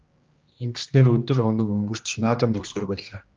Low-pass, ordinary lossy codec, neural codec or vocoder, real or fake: 7.2 kHz; Opus, 24 kbps; codec, 16 kHz, 1 kbps, X-Codec, HuBERT features, trained on general audio; fake